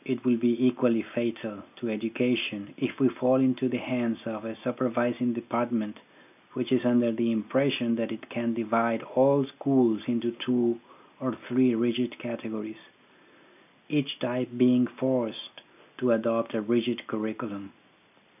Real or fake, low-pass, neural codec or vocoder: real; 3.6 kHz; none